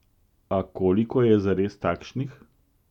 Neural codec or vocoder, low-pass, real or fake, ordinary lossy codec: none; 19.8 kHz; real; none